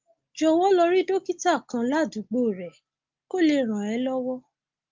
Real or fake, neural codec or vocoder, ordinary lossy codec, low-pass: real; none; Opus, 32 kbps; 7.2 kHz